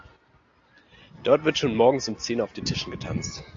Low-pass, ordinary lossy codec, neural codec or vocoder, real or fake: 7.2 kHz; AAC, 48 kbps; none; real